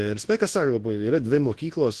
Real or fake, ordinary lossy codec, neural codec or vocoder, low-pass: fake; Opus, 16 kbps; codec, 24 kHz, 0.9 kbps, WavTokenizer, large speech release; 10.8 kHz